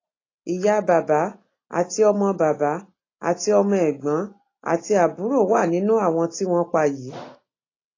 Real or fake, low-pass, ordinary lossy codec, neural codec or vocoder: real; 7.2 kHz; AAC, 32 kbps; none